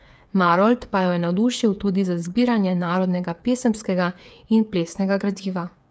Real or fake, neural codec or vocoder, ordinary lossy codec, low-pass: fake; codec, 16 kHz, 8 kbps, FreqCodec, smaller model; none; none